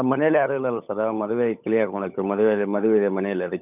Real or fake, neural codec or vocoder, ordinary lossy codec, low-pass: fake; codec, 16 kHz, 16 kbps, FunCodec, trained on LibriTTS, 50 frames a second; none; 3.6 kHz